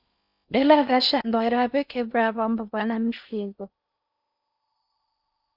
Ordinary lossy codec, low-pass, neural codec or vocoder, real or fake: Opus, 64 kbps; 5.4 kHz; codec, 16 kHz in and 24 kHz out, 0.6 kbps, FocalCodec, streaming, 4096 codes; fake